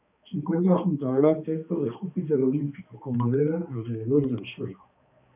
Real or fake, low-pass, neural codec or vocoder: fake; 3.6 kHz; codec, 16 kHz, 2 kbps, X-Codec, HuBERT features, trained on balanced general audio